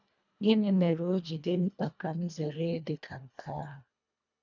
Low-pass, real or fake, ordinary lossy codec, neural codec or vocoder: 7.2 kHz; fake; none; codec, 24 kHz, 1.5 kbps, HILCodec